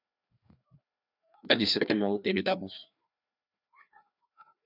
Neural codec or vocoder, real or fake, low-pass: codec, 16 kHz, 2 kbps, FreqCodec, larger model; fake; 5.4 kHz